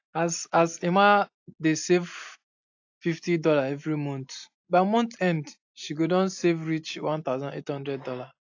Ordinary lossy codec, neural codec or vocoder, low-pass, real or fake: AAC, 48 kbps; none; 7.2 kHz; real